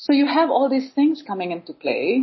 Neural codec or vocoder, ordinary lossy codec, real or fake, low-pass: none; MP3, 24 kbps; real; 7.2 kHz